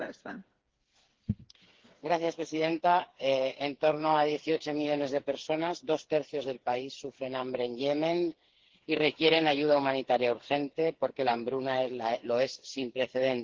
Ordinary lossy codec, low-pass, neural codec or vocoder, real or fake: Opus, 16 kbps; 7.2 kHz; codec, 16 kHz, 4 kbps, FreqCodec, smaller model; fake